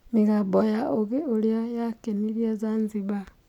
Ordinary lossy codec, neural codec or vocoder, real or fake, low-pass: none; none; real; 19.8 kHz